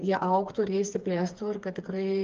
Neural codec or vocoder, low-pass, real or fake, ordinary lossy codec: codec, 16 kHz, 4 kbps, FreqCodec, smaller model; 7.2 kHz; fake; Opus, 32 kbps